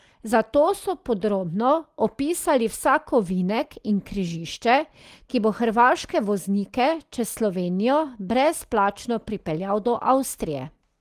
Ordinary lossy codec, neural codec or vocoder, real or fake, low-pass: Opus, 24 kbps; none; real; 14.4 kHz